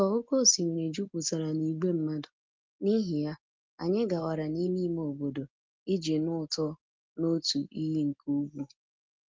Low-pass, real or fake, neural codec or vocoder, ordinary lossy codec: 7.2 kHz; real; none; Opus, 32 kbps